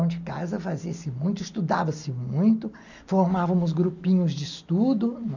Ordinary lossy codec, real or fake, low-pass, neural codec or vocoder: none; real; 7.2 kHz; none